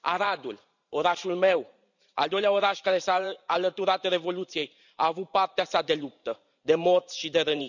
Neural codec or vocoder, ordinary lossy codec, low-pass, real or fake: vocoder, 44.1 kHz, 128 mel bands every 512 samples, BigVGAN v2; none; 7.2 kHz; fake